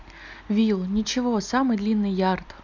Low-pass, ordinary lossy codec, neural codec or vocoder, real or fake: 7.2 kHz; none; none; real